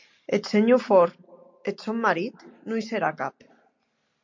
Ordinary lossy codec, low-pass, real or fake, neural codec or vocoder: MP3, 48 kbps; 7.2 kHz; real; none